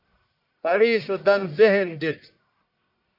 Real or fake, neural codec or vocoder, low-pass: fake; codec, 44.1 kHz, 1.7 kbps, Pupu-Codec; 5.4 kHz